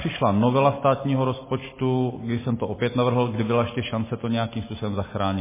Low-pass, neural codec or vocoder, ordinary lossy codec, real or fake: 3.6 kHz; none; MP3, 16 kbps; real